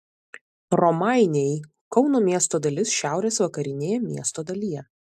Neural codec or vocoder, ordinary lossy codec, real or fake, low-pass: none; AAC, 96 kbps; real; 14.4 kHz